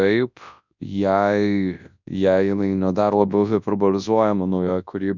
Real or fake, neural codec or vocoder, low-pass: fake; codec, 24 kHz, 0.9 kbps, WavTokenizer, large speech release; 7.2 kHz